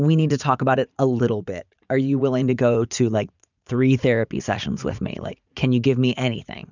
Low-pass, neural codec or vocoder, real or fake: 7.2 kHz; vocoder, 44.1 kHz, 80 mel bands, Vocos; fake